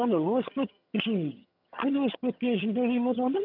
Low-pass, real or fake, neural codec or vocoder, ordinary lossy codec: 5.4 kHz; fake; vocoder, 22.05 kHz, 80 mel bands, HiFi-GAN; none